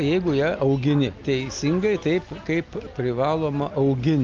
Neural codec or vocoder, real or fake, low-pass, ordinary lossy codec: none; real; 7.2 kHz; Opus, 24 kbps